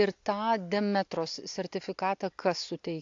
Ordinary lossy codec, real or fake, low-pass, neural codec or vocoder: AAC, 48 kbps; real; 7.2 kHz; none